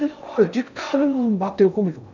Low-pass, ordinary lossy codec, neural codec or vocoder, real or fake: 7.2 kHz; none; codec, 16 kHz in and 24 kHz out, 0.6 kbps, FocalCodec, streaming, 4096 codes; fake